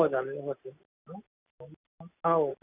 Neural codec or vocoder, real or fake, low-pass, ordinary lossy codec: none; real; 3.6 kHz; none